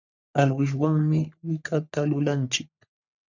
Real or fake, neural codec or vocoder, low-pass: fake; codec, 44.1 kHz, 3.4 kbps, Pupu-Codec; 7.2 kHz